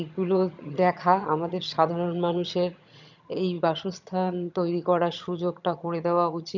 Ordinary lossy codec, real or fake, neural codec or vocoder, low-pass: none; fake; vocoder, 22.05 kHz, 80 mel bands, HiFi-GAN; 7.2 kHz